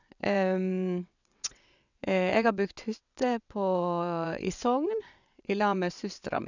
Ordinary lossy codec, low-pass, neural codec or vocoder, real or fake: none; 7.2 kHz; vocoder, 22.05 kHz, 80 mel bands, WaveNeXt; fake